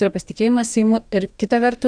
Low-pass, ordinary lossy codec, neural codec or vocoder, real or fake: 9.9 kHz; Opus, 64 kbps; codec, 44.1 kHz, 2.6 kbps, DAC; fake